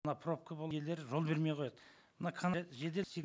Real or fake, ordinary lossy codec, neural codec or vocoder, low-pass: real; none; none; none